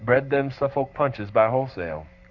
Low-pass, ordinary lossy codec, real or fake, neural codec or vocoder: 7.2 kHz; Opus, 64 kbps; fake; codec, 44.1 kHz, 7.8 kbps, Pupu-Codec